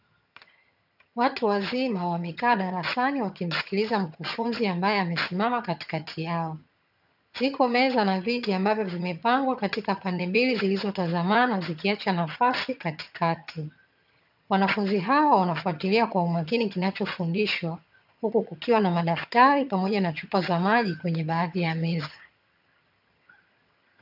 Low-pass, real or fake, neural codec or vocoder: 5.4 kHz; fake; vocoder, 22.05 kHz, 80 mel bands, HiFi-GAN